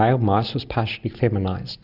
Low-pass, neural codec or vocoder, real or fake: 5.4 kHz; none; real